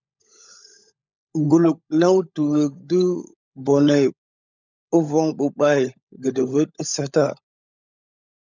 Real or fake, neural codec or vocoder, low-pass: fake; codec, 16 kHz, 16 kbps, FunCodec, trained on LibriTTS, 50 frames a second; 7.2 kHz